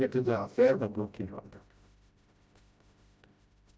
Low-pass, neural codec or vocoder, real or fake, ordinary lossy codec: none; codec, 16 kHz, 0.5 kbps, FreqCodec, smaller model; fake; none